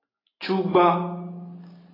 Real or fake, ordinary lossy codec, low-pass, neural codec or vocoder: real; AAC, 24 kbps; 5.4 kHz; none